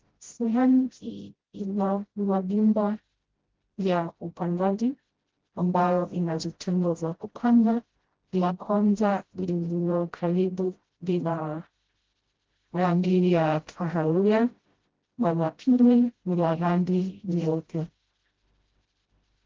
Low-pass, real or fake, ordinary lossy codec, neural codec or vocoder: 7.2 kHz; fake; Opus, 16 kbps; codec, 16 kHz, 0.5 kbps, FreqCodec, smaller model